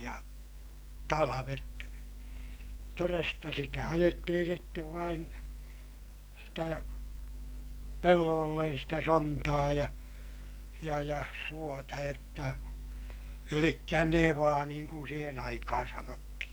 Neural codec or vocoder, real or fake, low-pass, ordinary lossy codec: codec, 44.1 kHz, 2.6 kbps, SNAC; fake; none; none